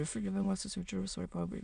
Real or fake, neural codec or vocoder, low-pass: fake; autoencoder, 22.05 kHz, a latent of 192 numbers a frame, VITS, trained on many speakers; 9.9 kHz